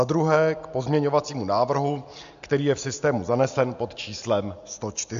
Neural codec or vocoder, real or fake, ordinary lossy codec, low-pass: none; real; MP3, 64 kbps; 7.2 kHz